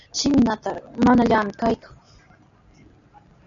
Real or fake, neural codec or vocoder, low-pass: real; none; 7.2 kHz